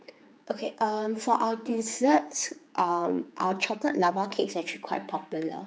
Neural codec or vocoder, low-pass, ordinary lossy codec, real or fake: codec, 16 kHz, 4 kbps, X-Codec, HuBERT features, trained on general audio; none; none; fake